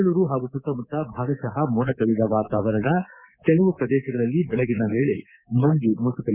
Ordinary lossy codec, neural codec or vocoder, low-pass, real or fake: Opus, 64 kbps; codec, 24 kHz, 3.1 kbps, DualCodec; 3.6 kHz; fake